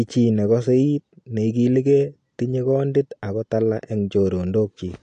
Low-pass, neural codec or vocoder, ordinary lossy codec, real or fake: 9.9 kHz; none; AAC, 48 kbps; real